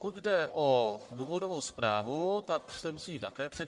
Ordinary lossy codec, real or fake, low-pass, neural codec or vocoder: Opus, 64 kbps; fake; 10.8 kHz; codec, 44.1 kHz, 1.7 kbps, Pupu-Codec